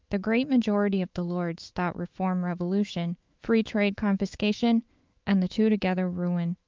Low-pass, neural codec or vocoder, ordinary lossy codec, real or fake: 7.2 kHz; autoencoder, 48 kHz, 128 numbers a frame, DAC-VAE, trained on Japanese speech; Opus, 32 kbps; fake